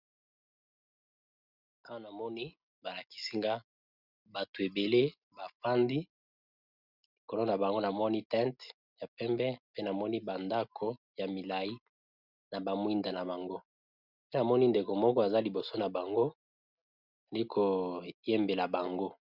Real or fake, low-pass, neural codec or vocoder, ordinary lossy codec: real; 5.4 kHz; none; Opus, 64 kbps